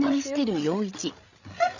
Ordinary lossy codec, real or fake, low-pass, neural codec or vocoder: none; fake; 7.2 kHz; codec, 16 kHz, 16 kbps, FreqCodec, larger model